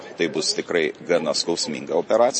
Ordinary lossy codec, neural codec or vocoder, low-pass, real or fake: MP3, 32 kbps; vocoder, 44.1 kHz, 128 mel bands every 512 samples, BigVGAN v2; 9.9 kHz; fake